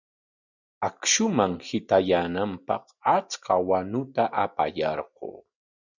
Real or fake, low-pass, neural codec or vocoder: real; 7.2 kHz; none